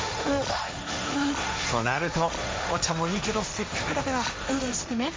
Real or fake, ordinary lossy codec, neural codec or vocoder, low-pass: fake; none; codec, 16 kHz, 1.1 kbps, Voila-Tokenizer; none